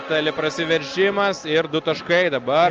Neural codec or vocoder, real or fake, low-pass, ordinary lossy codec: none; real; 7.2 kHz; Opus, 24 kbps